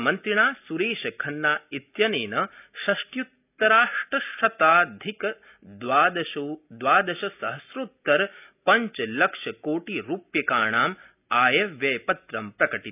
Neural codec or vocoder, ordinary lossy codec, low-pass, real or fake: none; none; 3.6 kHz; real